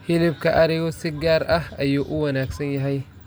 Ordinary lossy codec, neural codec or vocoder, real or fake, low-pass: none; none; real; none